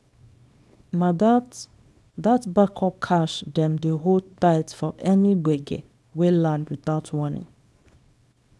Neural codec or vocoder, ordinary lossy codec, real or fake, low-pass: codec, 24 kHz, 0.9 kbps, WavTokenizer, small release; none; fake; none